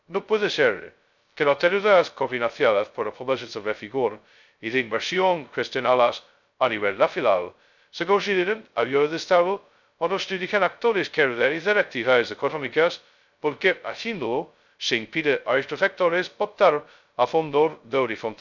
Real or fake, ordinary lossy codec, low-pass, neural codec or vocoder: fake; none; 7.2 kHz; codec, 16 kHz, 0.2 kbps, FocalCodec